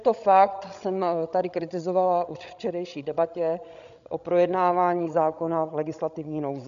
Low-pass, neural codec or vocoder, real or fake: 7.2 kHz; codec, 16 kHz, 16 kbps, FreqCodec, larger model; fake